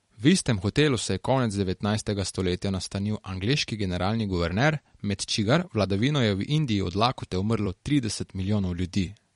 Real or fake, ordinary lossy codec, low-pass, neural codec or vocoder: fake; MP3, 48 kbps; 19.8 kHz; vocoder, 44.1 kHz, 128 mel bands every 256 samples, BigVGAN v2